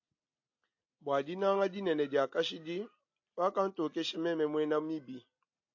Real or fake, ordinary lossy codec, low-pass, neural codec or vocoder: real; AAC, 48 kbps; 7.2 kHz; none